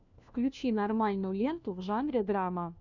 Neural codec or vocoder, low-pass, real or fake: codec, 16 kHz, 1 kbps, FunCodec, trained on LibriTTS, 50 frames a second; 7.2 kHz; fake